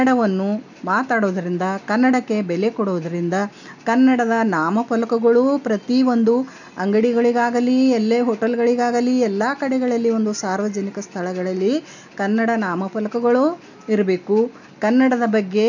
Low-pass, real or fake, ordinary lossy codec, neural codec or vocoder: 7.2 kHz; real; none; none